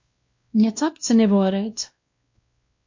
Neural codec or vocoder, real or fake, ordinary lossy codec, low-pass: codec, 16 kHz, 1 kbps, X-Codec, WavLM features, trained on Multilingual LibriSpeech; fake; MP3, 48 kbps; 7.2 kHz